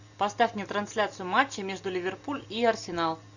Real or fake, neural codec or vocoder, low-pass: real; none; 7.2 kHz